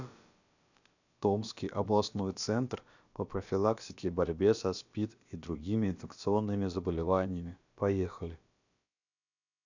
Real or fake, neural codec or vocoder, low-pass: fake; codec, 16 kHz, about 1 kbps, DyCAST, with the encoder's durations; 7.2 kHz